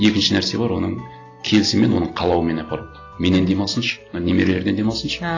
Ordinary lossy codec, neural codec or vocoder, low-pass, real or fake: AAC, 32 kbps; none; 7.2 kHz; real